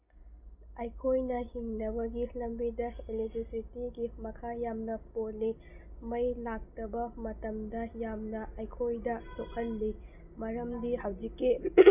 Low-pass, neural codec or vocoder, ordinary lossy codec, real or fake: 3.6 kHz; none; none; real